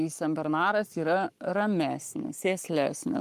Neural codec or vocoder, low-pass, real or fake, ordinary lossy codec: codec, 44.1 kHz, 7.8 kbps, Pupu-Codec; 14.4 kHz; fake; Opus, 32 kbps